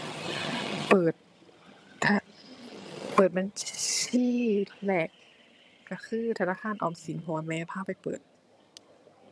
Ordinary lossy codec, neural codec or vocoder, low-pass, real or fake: none; vocoder, 22.05 kHz, 80 mel bands, HiFi-GAN; none; fake